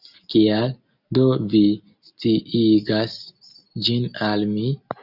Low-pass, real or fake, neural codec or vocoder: 5.4 kHz; real; none